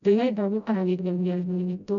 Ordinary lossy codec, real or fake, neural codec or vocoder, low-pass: none; fake; codec, 16 kHz, 0.5 kbps, FreqCodec, smaller model; 7.2 kHz